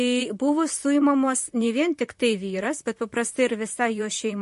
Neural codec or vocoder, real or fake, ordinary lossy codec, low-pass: vocoder, 44.1 kHz, 128 mel bands, Pupu-Vocoder; fake; MP3, 48 kbps; 14.4 kHz